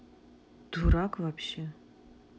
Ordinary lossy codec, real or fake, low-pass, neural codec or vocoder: none; real; none; none